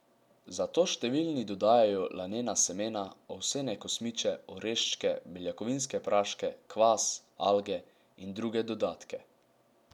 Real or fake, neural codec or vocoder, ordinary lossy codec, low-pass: real; none; none; 19.8 kHz